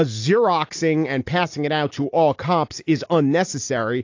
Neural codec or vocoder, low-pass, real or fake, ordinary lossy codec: none; 7.2 kHz; real; AAC, 48 kbps